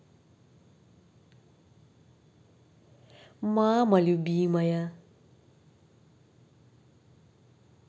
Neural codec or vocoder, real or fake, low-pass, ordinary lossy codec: none; real; none; none